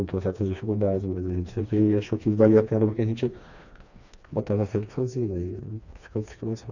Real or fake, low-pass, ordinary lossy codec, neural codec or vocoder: fake; 7.2 kHz; none; codec, 16 kHz, 2 kbps, FreqCodec, smaller model